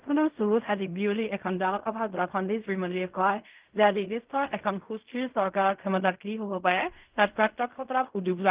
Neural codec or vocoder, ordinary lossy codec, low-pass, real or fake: codec, 16 kHz in and 24 kHz out, 0.4 kbps, LongCat-Audio-Codec, fine tuned four codebook decoder; Opus, 32 kbps; 3.6 kHz; fake